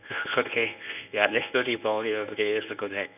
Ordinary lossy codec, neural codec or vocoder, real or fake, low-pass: none; codec, 24 kHz, 0.9 kbps, WavTokenizer, medium speech release version 1; fake; 3.6 kHz